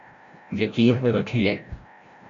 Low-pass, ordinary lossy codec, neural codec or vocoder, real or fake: 7.2 kHz; MP3, 48 kbps; codec, 16 kHz, 0.5 kbps, FreqCodec, larger model; fake